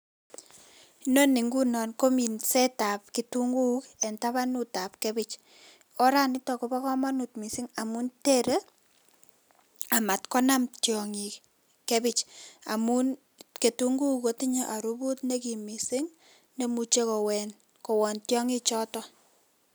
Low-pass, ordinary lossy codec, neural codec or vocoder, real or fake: none; none; none; real